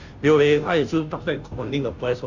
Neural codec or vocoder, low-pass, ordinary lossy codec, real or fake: codec, 16 kHz, 0.5 kbps, FunCodec, trained on Chinese and English, 25 frames a second; 7.2 kHz; none; fake